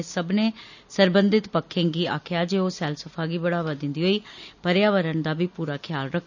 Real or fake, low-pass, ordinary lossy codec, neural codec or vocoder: real; 7.2 kHz; none; none